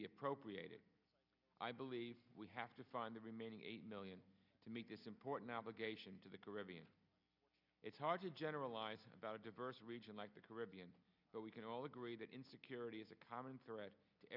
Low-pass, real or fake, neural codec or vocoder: 5.4 kHz; real; none